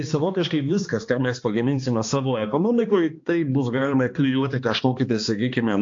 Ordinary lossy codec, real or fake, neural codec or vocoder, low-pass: AAC, 48 kbps; fake; codec, 16 kHz, 2 kbps, X-Codec, HuBERT features, trained on balanced general audio; 7.2 kHz